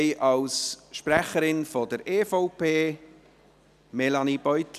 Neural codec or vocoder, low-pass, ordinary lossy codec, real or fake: none; 14.4 kHz; none; real